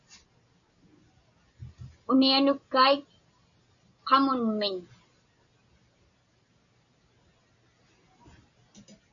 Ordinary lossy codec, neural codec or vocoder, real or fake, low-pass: MP3, 96 kbps; none; real; 7.2 kHz